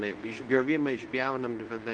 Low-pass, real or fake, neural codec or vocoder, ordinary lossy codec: 9.9 kHz; fake; codec, 24 kHz, 0.9 kbps, WavTokenizer, medium speech release version 2; Opus, 24 kbps